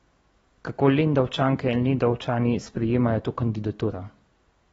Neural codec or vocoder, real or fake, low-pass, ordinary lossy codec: none; real; 19.8 kHz; AAC, 24 kbps